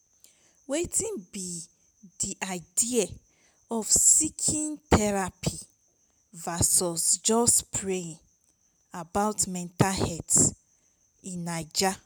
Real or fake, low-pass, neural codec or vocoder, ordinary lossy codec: real; none; none; none